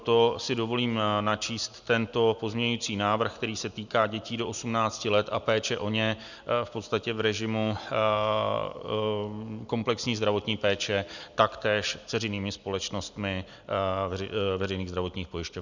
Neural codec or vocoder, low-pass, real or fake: none; 7.2 kHz; real